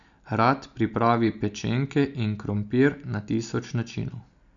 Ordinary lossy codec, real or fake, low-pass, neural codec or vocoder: none; real; 7.2 kHz; none